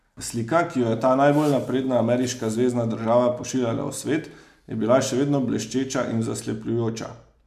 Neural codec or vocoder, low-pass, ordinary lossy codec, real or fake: none; 14.4 kHz; MP3, 96 kbps; real